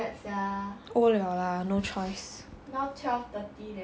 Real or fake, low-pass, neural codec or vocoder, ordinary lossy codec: real; none; none; none